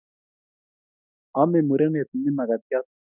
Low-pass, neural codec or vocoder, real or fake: 3.6 kHz; none; real